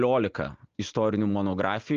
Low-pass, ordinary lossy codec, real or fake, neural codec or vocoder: 7.2 kHz; Opus, 32 kbps; real; none